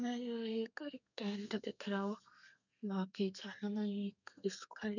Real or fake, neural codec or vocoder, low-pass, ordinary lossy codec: fake; codec, 32 kHz, 1.9 kbps, SNAC; 7.2 kHz; none